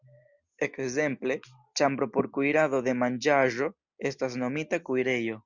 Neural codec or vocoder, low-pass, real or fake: none; 7.2 kHz; real